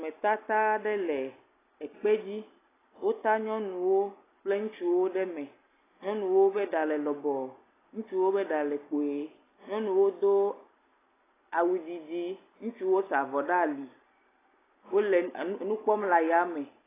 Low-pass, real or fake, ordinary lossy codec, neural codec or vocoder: 3.6 kHz; real; AAC, 16 kbps; none